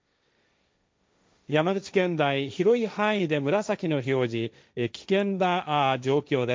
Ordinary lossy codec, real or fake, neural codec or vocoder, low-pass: none; fake; codec, 16 kHz, 1.1 kbps, Voila-Tokenizer; none